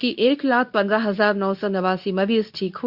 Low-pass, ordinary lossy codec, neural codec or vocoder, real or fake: 5.4 kHz; none; codec, 24 kHz, 0.9 kbps, WavTokenizer, medium speech release version 2; fake